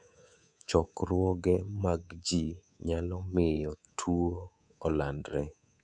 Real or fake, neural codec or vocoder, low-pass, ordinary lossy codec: fake; codec, 24 kHz, 3.1 kbps, DualCodec; 9.9 kHz; none